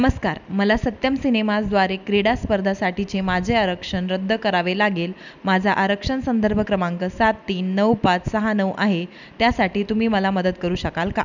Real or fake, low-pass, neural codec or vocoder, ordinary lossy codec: real; 7.2 kHz; none; none